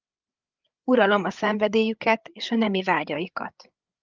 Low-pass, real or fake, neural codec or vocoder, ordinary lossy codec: 7.2 kHz; fake; codec, 16 kHz, 8 kbps, FreqCodec, larger model; Opus, 24 kbps